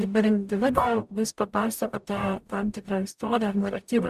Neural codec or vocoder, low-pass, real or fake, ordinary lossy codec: codec, 44.1 kHz, 0.9 kbps, DAC; 14.4 kHz; fake; Opus, 64 kbps